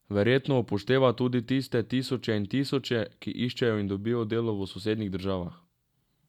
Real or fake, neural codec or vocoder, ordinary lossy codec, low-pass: real; none; none; 19.8 kHz